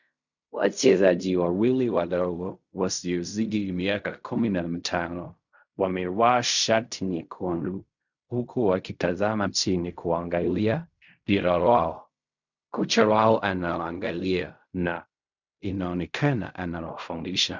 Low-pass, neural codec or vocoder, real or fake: 7.2 kHz; codec, 16 kHz in and 24 kHz out, 0.4 kbps, LongCat-Audio-Codec, fine tuned four codebook decoder; fake